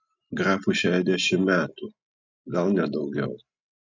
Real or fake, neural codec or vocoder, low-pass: real; none; 7.2 kHz